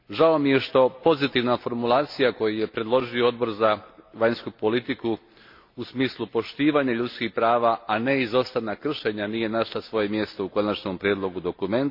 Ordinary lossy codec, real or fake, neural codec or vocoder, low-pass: MP3, 32 kbps; real; none; 5.4 kHz